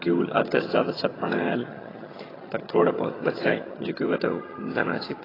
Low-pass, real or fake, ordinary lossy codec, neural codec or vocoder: 5.4 kHz; fake; AAC, 24 kbps; vocoder, 22.05 kHz, 80 mel bands, HiFi-GAN